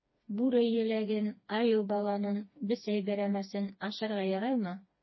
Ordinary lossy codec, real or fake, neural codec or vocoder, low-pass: MP3, 24 kbps; fake; codec, 16 kHz, 2 kbps, FreqCodec, smaller model; 7.2 kHz